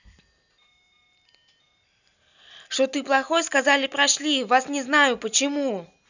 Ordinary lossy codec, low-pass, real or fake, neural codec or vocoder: none; 7.2 kHz; real; none